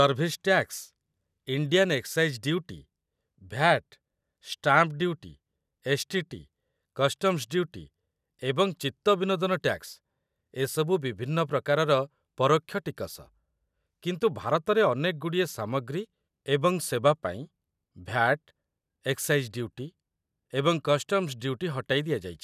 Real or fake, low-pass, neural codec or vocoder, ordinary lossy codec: real; 14.4 kHz; none; none